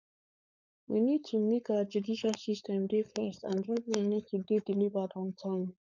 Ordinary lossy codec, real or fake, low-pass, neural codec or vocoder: none; fake; 7.2 kHz; codec, 16 kHz, 4.8 kbps, FACodec